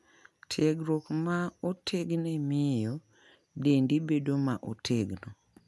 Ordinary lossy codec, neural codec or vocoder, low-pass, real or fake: none; none; none; real